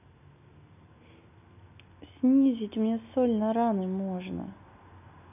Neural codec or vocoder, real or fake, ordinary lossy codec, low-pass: none; real; none; 3.6 kHz